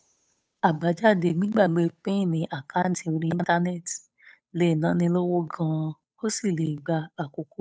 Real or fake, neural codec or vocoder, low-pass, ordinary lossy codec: fake; codec, 16 kHz, 8 kbps, FunCodec, trained on Chinese and English, 25 frames a second; none; none